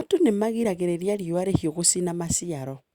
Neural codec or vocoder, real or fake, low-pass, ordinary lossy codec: none; real; 19.8 kHz; Opus, 64 kbps